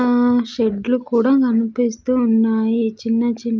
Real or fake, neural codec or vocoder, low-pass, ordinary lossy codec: real; none; 7.2 kHz; Opus, 24 kbps